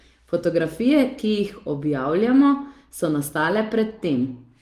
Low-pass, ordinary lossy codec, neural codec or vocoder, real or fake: 14.4 kHz; Opus, 24 kbps; none; real